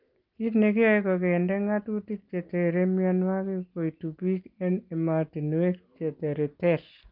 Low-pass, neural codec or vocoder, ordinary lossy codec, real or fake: 5.4 kHz; none; Opus, 32 kbps; real